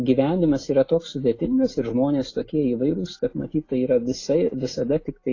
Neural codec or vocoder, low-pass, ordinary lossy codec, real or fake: none; 7.2 kHz; AAC, 32 kbps; real